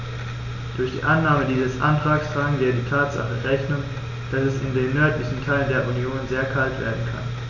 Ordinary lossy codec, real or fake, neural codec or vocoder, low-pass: none; real; none; 7.2 kHz